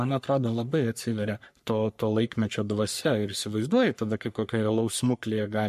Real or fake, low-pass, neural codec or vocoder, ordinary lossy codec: fake; 14.4 kHz; codec, 44.1 kHz, 3.4 kbps, Pupu-Codec; MP3, 64 kbps